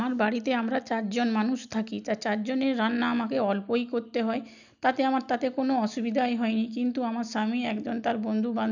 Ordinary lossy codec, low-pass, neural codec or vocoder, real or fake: none; 7.2 kHz; none; real